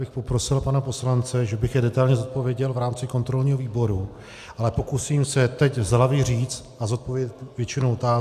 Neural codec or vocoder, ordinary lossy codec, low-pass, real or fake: none; AAC, 96 kbps; 14.4 kHz; real